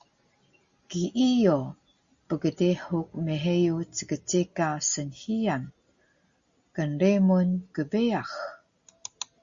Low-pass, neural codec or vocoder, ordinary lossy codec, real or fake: 7.2 kHz; none; Opus, 64 kbps; real